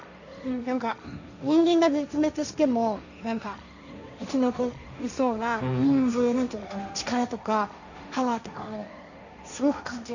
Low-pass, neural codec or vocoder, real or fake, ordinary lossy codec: 7.2 kHz; codec, 16 kHz, 1.1 kbps, Voila-Tokenizer; fake; none